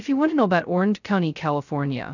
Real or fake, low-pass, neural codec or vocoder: fake; 7.2 kHz; codec, 16 kHz, 0.2 kbps, FocalCodec